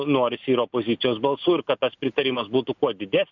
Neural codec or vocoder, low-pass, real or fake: none; 7.2 kHz; real